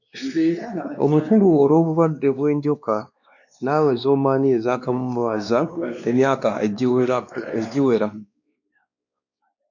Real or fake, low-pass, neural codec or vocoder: fake; 7.2 kHz; codec, 16 kHz, 2 kbps, X-Codec, WavLM features, trained on Multilingual LibriSpeech